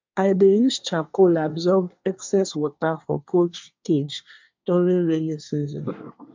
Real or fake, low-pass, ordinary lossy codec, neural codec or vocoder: fake; 7.2 kHz; MP3, 64 kbps; codec, 24 kHz, 1 kbps, SNAC